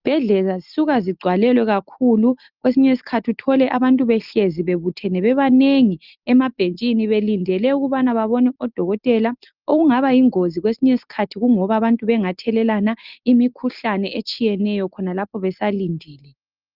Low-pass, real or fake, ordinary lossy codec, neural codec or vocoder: 5.4 kHz; real; Opus, 24 kbps; none